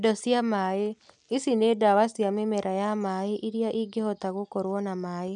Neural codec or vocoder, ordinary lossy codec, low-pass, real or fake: none; none; 9.9 kHz; real